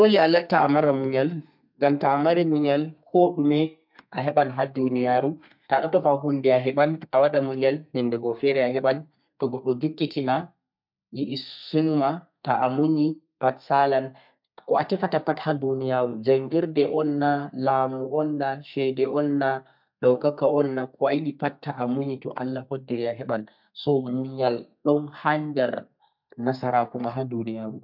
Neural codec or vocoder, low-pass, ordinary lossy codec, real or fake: codec, 32 kHz, 1.9 kbps, SNAC; 5.4 kHz; MP3, 48 kbps; fake